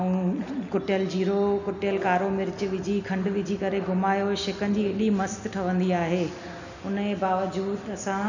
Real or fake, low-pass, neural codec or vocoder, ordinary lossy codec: real; 7.2 kHz; none; none